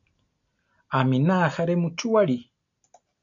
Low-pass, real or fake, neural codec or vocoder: 7.2 kHz; real; none